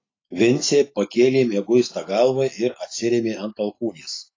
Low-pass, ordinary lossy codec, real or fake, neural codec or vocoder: 7.2 kHz; AAC, 32 kbps; real; none